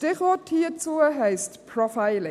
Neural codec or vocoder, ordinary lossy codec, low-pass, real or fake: none; none; 14.4 kHz; real